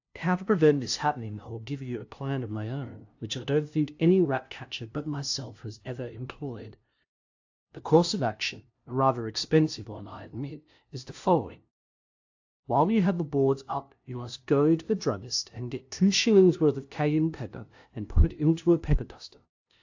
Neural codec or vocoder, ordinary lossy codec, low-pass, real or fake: codec, 16 kHz, 0.5 kbps, FunCodec, trained on LibriTTS, 25 frames a second; AAC, 48 kbps; 7.2 kHz; fake